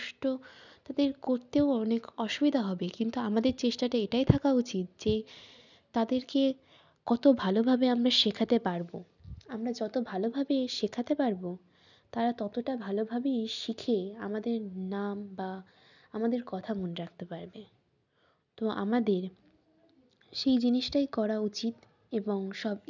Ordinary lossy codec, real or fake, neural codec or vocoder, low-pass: none; real; none; 7.2 kHz